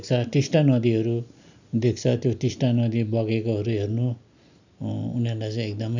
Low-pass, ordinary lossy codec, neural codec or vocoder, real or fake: 7.2 kHz; none; none; real